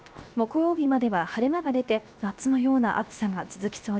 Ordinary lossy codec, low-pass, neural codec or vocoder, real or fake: none; none; codec, 16 kHz, 0.7 kbps, FocalCodec; fake